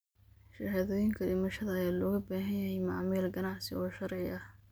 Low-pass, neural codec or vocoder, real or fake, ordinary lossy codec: none; none; real; none